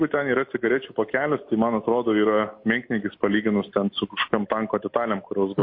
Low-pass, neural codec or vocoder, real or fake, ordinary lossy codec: 5.4 kHz; none; real; MP3, 32 kbps